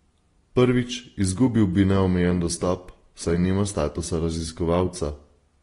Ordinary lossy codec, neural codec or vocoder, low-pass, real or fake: AAC, 32 kbps; none; 10.8 kHz; real